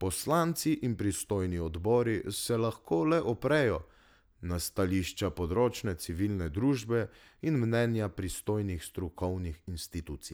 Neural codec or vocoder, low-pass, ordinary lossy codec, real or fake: none; none; none; real